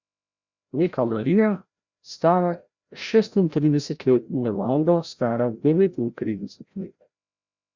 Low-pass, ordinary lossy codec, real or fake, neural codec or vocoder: 7.2 kHz; Opus, 64 kbps; fake; codec, 16 kHz, 0.5 kbps, FreqCodec, larger model